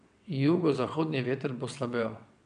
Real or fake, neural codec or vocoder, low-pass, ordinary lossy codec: fake; vocoder, 22.05 kHz, 80 mel bands, WaveNeXt; 9.9 kHz; MP3, 96 kbps